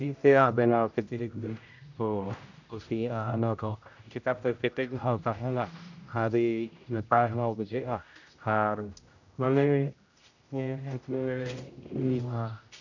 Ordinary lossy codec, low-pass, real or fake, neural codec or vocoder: none; 7.2 kHz; fake; codec, 16 kHz, 0.5 kbps, X-Codec, HuBERT features, trained on general audio